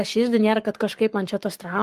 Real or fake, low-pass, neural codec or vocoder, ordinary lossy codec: fake; 14.4 kHz; codec, 44.1 kHz, 7.8 kbps, Pupu-Codec; Opus, 32 kbps